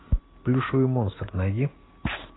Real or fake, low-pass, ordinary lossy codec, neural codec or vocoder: real; 7.2 kHz; AAC, 16 kbps; none